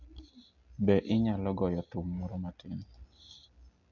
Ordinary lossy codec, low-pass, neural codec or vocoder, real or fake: none; 7.2 kHz; none; real